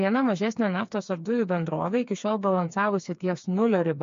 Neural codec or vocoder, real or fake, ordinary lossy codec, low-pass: codec, 16 kHz, 4 kbps, FreqCodec, smaller model; fake; MP3, 48 kbps; 7.2 kHz